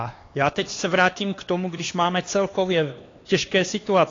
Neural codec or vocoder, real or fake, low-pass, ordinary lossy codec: codec, 16 kHz, 2 kbps, X-Codec, HuBERT features, trained on LibriSpeech; fake; 7.2 kHz; AAC, 32 kbps